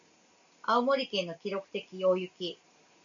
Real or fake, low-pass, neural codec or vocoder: real; 7.2 kHz; none